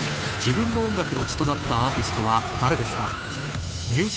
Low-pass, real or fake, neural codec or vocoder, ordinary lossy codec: none; fake; codec, 16 kHz, 2 kbps, FunCodec, trained on Chinese and English, 25 frames a second; none